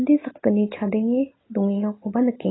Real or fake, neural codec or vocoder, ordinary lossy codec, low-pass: real; none; AAC, 16 kbps; 7.2 kHz